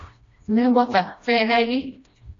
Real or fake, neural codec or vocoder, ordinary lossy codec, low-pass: fake; codec, 16 kHz, 1 kbps, FreqCodec, smaller model; AAC, 48 kbps; 7.2 kHz